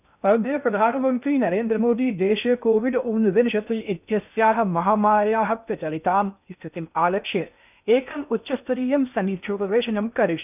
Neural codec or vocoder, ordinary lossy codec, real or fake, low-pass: codec, 16 kHz in and 24 kHz out, 0.6 kbps, FocalCodec, streaming, 2048 codes; none; fake; 3.6 kHz